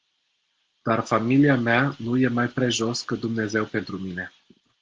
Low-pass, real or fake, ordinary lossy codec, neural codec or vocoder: 7.2 kHz; real; Opus, 16 kbps; none